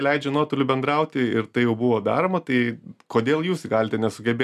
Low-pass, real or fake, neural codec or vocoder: 14.4 kHz; real; none